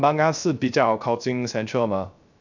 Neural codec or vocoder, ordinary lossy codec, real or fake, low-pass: codec, 16 kHz, 0.3 kbps, FocalCodec; none; fake; 7.2 kHz